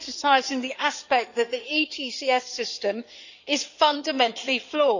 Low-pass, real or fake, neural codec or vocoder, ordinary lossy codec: 7.2 kHz; fake; codec, 16 kHz in and 24 kHz out, 2.2 kbps, FireRedTTS-2 codec; none